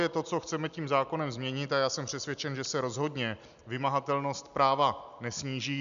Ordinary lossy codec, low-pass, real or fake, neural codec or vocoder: MP3, 96 kbps; 7.2 kHz; real; none